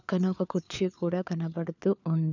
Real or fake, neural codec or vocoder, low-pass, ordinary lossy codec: fake; codec, 16 kHz, 4 kbps, FunCodec, trained on LibriTTS, 50 frames a second; 7.2 kHz; none